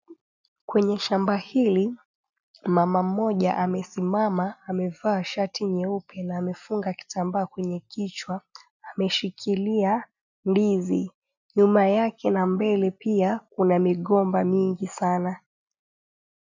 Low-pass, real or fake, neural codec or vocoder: 7.2 kHz; real; none